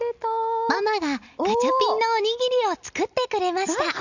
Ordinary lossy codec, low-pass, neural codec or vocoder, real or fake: none; 7.2 kHz; none; real